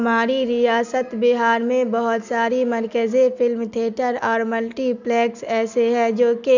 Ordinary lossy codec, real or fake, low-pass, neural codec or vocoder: none; real; 7.2 kHz; none